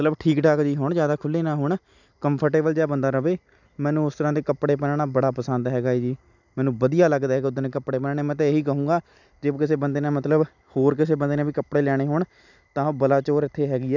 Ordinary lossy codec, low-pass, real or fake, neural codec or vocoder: none; 7.2 kHz; real; none